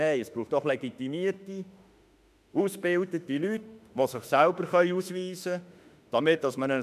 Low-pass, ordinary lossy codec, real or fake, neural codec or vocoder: 14.4 kHz; none; fake; autoencoder, 48 kHz, 32 numbers a frame, DAC-VAE, trained on Japanese speech